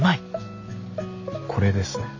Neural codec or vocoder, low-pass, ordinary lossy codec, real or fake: none; 7.2 kHz; AAC, 48 kbps; real